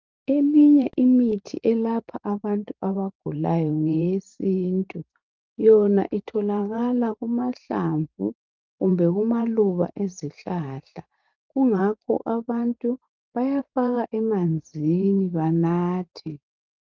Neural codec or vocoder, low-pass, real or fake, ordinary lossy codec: vocoder, 44.1 kHz, 128 mel bands every 512 samples, BigVGAN v2; 7.2 kHz; fake; Opus, 24 kbps